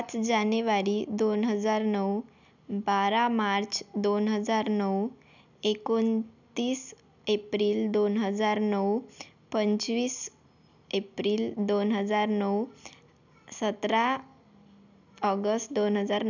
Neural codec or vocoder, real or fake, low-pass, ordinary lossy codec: none; real; 7.2 kHz; none